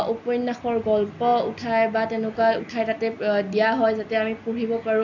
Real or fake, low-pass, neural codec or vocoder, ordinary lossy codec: real; 7.2 kHz; none; none